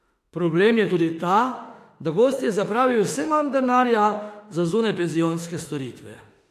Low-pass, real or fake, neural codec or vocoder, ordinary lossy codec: 14.4 kHz; fake; autoencoder, 48 kHz, 32 numbers a frame, DAC-VAE, trained on Japanese speech; AAC, 64 kbps